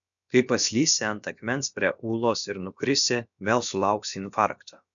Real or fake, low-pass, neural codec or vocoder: fake; 7.2 kHz; codec, 16 kHz, about 1 kbps, DyCAST, with the encoder's durations